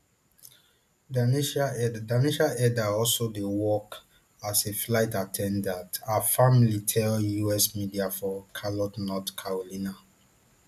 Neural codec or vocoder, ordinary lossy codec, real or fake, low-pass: none; none; real; 14.4 kHz